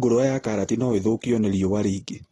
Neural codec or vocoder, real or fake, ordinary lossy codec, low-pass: none; real; AAC, 32 kbps; 14.4 kHz